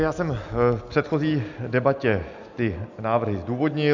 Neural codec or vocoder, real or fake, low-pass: none; real; 7.2 kHz